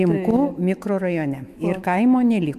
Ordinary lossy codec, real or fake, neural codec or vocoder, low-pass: AAC, 96 kbps; fake; autoencoder, 48 kHz, 128 numbers a frame, DAC-VAE, trained on Japanese speech; 14.4 kHz